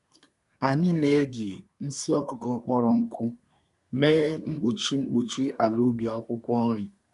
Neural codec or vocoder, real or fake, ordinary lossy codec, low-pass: codec, 24 kHz, 1 kbps, SNAC; fake; none; 10.8 kHz